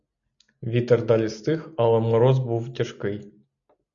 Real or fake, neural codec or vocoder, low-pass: real; none; 7.2 kHz